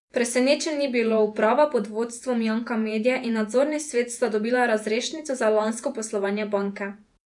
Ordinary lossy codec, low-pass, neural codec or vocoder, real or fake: none; 10.8 kHz; vocoder, 48 kHz, 128 mel bands, Vocos; fake